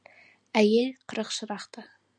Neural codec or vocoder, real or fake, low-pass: none; real; 9.9 kHz